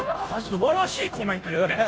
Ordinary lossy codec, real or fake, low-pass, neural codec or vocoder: none; fake; none; codec, 16 kHz, 0.5 kbps, FunCodec, trained on Chinese and English, 25 frames a second